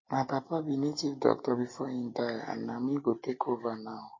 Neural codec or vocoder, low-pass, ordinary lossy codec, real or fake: none; 7.2 kHz; MP3, 32 kbps; real